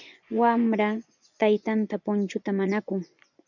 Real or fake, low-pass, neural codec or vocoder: fake; 7.2 kHz; vocoder, 24 kHz, 100 mel bands, Vocos